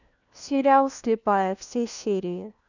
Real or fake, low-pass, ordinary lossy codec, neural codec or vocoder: fake; 7.2 kHz; none; codec, 16 kHz, 1 kbps, FunCodec, trained on LibriTTS, 50 frames a second